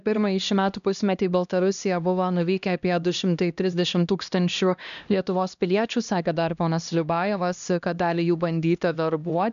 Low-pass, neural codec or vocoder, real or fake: 7.2 kHz; codec, 16 kHz, 1 kbps, X-Codec, HuBERT features, trained on LibriSpeech; fake